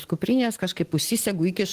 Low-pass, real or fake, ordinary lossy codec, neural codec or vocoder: 14.4 kHz; real; Opus, 16 kbps; none